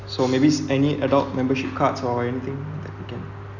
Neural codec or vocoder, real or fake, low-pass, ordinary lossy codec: none; real; 7.2 kHz; none